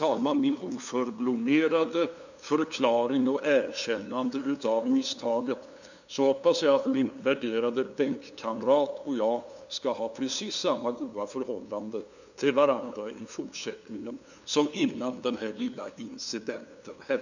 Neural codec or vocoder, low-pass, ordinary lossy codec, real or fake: codec, 16 kHz, 2 kbps, FunCodec, trained on LibriTTS, 25 frames a second; 7.2 kHz; none; fake